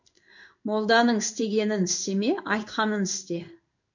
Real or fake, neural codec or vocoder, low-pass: fake; codec, 16 kHz in and 24 kHz out, 1 kbps, XY-Tokenizer; 7.2 kHz